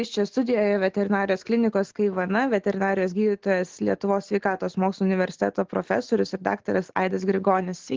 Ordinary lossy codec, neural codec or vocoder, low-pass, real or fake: Opus, 16 kbps; none; 7.2 kHz; real